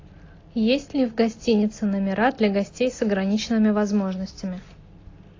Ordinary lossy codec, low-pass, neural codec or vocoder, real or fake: AAC, 32 kbps; 7.2 kHz; none; real